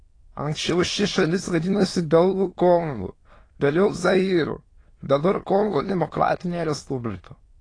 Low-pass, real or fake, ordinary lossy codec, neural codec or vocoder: 9.9 kHz; fake; AAC, 32 kbps; autoencoder, 22.05 kHz, a latent of 192 numbers a frame, VITS, trained on many speakers